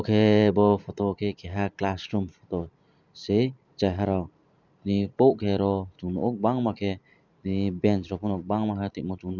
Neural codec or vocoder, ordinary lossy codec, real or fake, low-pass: vocoder, 44.1 kHz, 128 mel bands every 512 samples, BigVGAN v2; none; fake; 7.2 kHz